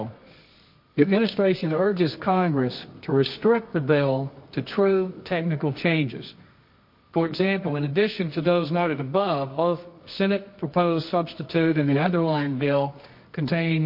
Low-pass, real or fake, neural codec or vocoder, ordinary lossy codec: 5.4 kHz; fake; codec, 24 kHz, 0.9 kbps, WavTokenizer, medium music audio release; MP3, 32 kbps